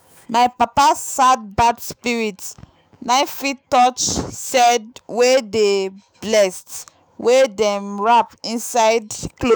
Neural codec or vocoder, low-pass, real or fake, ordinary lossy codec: autoencoder, 48 kHz, 128 numbers a frame, DAC-VAE, trained on Japanese speech; none; fake; none